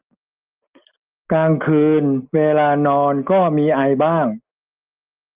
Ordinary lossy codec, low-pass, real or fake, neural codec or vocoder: Opus, 32 kbps; 3.6 kHz; real; none